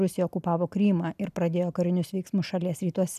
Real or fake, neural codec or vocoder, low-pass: real; none; 14.4 kHz